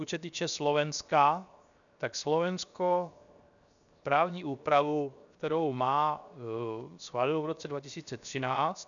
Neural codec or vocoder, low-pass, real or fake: codec, 16 kHz, 0.7 kbps, FocalCodec; 7.2 kHz; fake